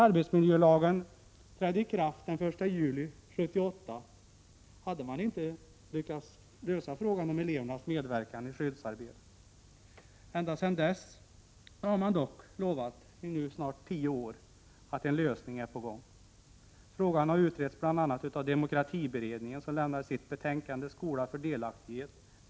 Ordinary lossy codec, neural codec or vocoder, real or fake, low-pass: none; none; real; none